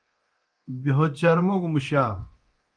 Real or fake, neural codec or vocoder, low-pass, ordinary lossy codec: fake; codec, 24 kHz, 0.9 kbps, DualCodec; 9.9 kHz; Opus, 24 kbps